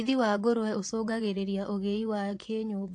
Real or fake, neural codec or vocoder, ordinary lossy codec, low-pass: fake; vocoder, 24 kHz, 100 mel bands, Vocos; AAC, 48 kbps; 10.8 kHz